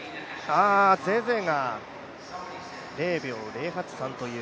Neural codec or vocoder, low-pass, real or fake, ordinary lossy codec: none; none; real; none